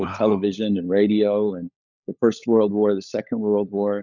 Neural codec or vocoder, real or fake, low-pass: codec, 16 kHz, 16 kbps, FunCodec, trained on LibriTTS, 50 frames a second; fake; 7.2 kHz